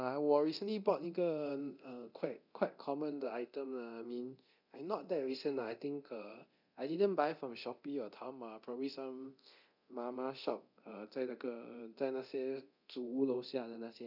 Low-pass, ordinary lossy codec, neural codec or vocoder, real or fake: 5.4 kHz; none; codec, 24 kHz, 0.9 kbps, DualCodec; fake